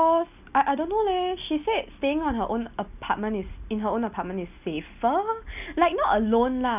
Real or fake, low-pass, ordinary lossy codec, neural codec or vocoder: real; 3.6 kHz; none; none